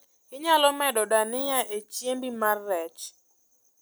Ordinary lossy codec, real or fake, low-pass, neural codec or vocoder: none; real; none; none